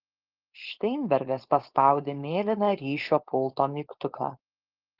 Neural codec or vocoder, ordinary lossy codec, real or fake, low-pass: codec, 16 kHz, 4.8 kbps, FACodec; Opus, 16 kbps; fake; 5.4 kHz